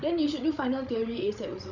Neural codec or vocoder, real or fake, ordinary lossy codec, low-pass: codec, 16 kHz, 16 kbps, FreqCodec, larger model; fake; none; 7.2 kHz